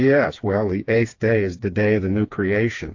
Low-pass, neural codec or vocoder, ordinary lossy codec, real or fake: 7.2 kHz; codec, 16 kHz, 4 kbps, FreqCodec, smaller model; AAC, 48 kbps; fake